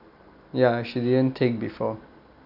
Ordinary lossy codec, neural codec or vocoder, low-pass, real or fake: none; none; 5.4 kHz; real